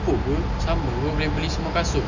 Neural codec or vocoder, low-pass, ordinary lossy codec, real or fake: none; 7.2 kHz; none; real